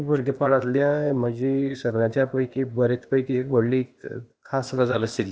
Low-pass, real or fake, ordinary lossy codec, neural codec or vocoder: none; fake; none; codec, 16 kHz, 0.8 kbps, ZipCodec